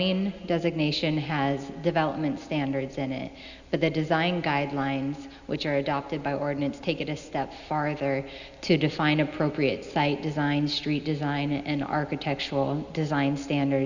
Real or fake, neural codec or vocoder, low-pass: real; none; 7.2 kHz